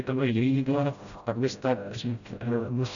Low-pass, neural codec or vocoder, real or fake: 7.2 kHz; codec, 16 kHz, 0.5 kbps, FreqCodec, smaller model; fake